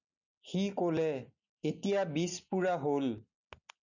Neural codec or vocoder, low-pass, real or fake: none; 7.2 kHz; real